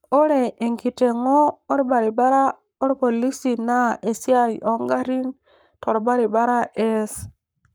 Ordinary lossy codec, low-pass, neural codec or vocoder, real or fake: none; none; codec, 44.1 kHz, 7.8 kbps, Pupu-Codec; fake